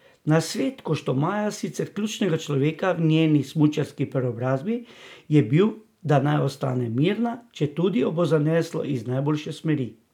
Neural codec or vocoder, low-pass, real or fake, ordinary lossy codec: none; 19.8 kHz; real; none